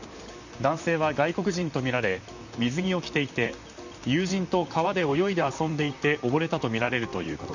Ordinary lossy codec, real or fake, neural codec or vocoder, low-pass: none; fake; vocoder, 44.1 kHz, 128 mel bands, Pupu-Vocoder; 7.2 kHz